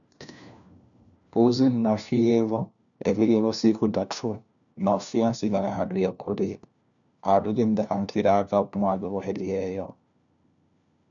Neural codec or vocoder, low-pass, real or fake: codec, 16 kHz, 1 kbps, FunCodec, trained on LibriTTS, 50 frames a second; 7.2 kHz; fake